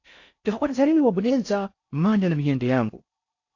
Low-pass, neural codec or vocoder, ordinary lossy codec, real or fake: 7.2 kHz; codec, 16 kHz in and 24 kHz out, 0.6 kbps, FocalCodec, streaming, 4096 codes; AAC, 48 kbps; fake